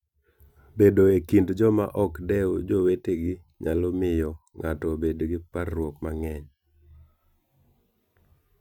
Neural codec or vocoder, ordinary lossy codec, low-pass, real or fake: none; none; 19.8 kHz; real